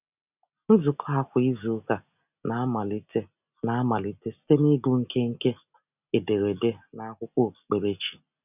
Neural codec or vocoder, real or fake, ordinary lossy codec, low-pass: none; real; none; 3.6 kHz